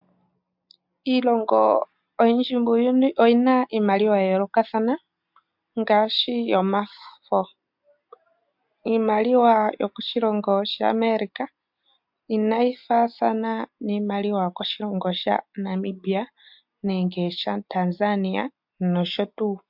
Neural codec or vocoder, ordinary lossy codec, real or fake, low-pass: none; MP3, 48 kbps; real; 5.4 kHz